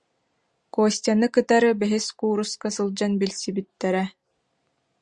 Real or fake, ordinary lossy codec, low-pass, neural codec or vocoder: real; Opus, 64 kbps; 9.9 kHz; none